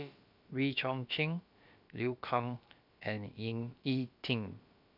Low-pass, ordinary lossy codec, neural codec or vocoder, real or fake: 5.4 kHz; MP3, 48 kbps; codec, 16 kHz, about 1 kbps, DyCAST, with the encoder's durations; fake